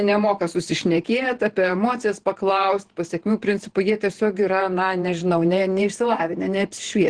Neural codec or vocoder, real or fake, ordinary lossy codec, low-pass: vocoder, 48 kHz, 128 mel bands, Vocos; fake; Opus, 16 kbps; 9.9 kHz